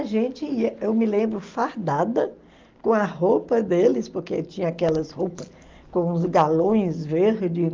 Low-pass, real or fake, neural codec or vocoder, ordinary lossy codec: 7.2 kHz; real; none; Opus, 32 kbps